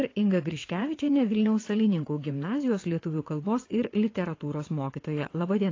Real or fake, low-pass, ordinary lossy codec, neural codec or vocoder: fake; 7.2 kHz; AAC, 32 kbps; vocoder, 22.05 kHz, 80 mel bands, WaveNeXt